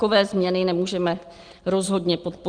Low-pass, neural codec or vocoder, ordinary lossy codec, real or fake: 9.9 kHz; none; Opus, 24 kbps; real